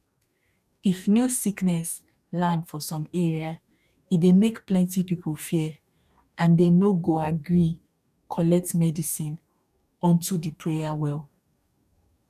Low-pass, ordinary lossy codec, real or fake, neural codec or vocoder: 14.4 kHz; none; fake; codec, 44.1 kHz, 2.6 kbps, DAC